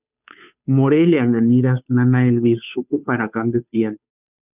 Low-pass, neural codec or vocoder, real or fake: 3.6 kHz; codec, 16 kHz, 2 kbps, FunCodec, trained on Chinese and English, 25 frames a second; fake